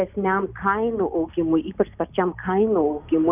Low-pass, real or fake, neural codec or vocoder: 3.6 kHz; real; none